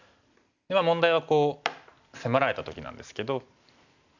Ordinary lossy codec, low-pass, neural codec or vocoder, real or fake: none; 7.2 kHz; none; real